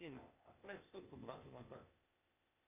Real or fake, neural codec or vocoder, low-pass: fake; codec, 16 kHz, 0.8 kbps, ZipCodec; 3.6 kHz